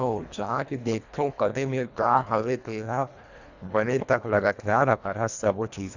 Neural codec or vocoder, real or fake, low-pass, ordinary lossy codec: codec, 24 kHz, 1.5 kbps, HILCodec; fake; 7.2 kHz; Opus, 64 kbps